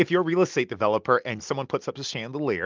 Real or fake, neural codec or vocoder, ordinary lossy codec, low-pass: real; none; Opus, 24 kbps; 7.2 kHz